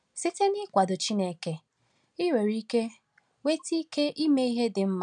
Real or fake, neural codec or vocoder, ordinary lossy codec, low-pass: real; none; none; 9.9 kHz